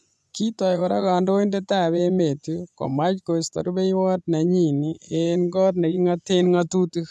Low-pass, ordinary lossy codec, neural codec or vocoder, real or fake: none; none; vocoder, 24 kHz, 100 mel bands, Vocos; fake